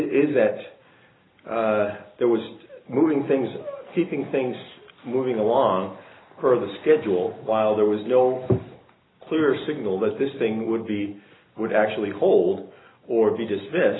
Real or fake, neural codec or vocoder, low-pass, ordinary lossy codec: real; none; 7.2 kHz; AAC, 16 kbps